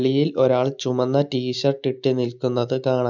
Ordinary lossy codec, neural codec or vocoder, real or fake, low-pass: none; none; real; 7.2 kHz